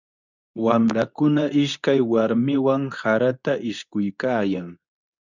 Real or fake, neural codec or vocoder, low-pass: fake; codec, 24 kHz, 0.9 kbps, WavTokenizer, medium speech release version 2; 7.2 kHz